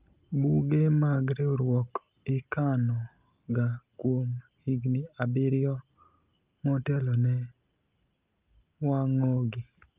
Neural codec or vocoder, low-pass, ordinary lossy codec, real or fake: none; 3.6 kHz; Opus, 24 kbps; real